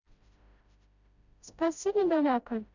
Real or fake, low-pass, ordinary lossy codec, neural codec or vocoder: fake; 7.2 kHz; none; codec, 16 kHz, 0.5 kbps, FreqCodec, smaller model